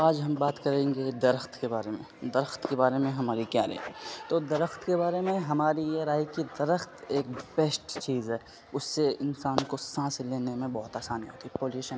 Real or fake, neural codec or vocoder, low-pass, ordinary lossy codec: real; none; none; none